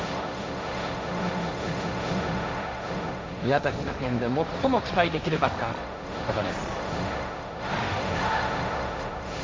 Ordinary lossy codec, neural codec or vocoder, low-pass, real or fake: none; codec, 16 kHz, 1.1 kbps, Voila-Tokenizer; none; fake